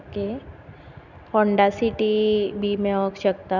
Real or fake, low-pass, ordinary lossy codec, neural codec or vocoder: real; 7.2 kHz; none; none